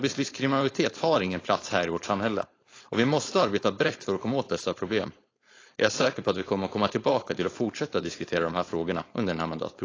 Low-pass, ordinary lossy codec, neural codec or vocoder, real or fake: 7.2 kHz; AAC, 32 kbps; codec, 16 kHz, 4.8 kbps, FACodec; fake